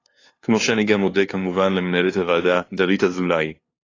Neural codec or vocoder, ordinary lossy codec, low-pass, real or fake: codec, 16 kHz, 2 kbps, FunCodec, trained on LibriTTS, 25 frames a second; AAC, 32 kbps; 7.2 kHz; fake